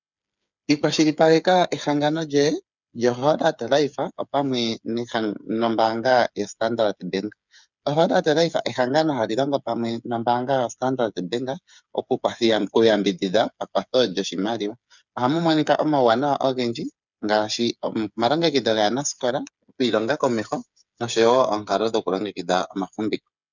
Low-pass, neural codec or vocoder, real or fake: 7.2 kHz; codec, 16 kHz, 8 kbps, FreqCodec, smaller model; fake